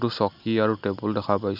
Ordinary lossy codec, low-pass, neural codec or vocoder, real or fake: none; 5.4 kHz; none; real